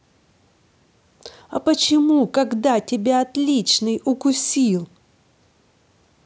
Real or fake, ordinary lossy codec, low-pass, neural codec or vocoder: real; none; none; none